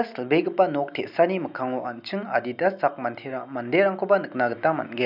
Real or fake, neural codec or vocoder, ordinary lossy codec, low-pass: real; none; none; 5.4 kHz